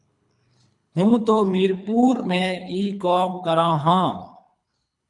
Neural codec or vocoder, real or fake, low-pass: codec, 24 kHz, 3 kbps, HILCodec; fake; 10.8 kHz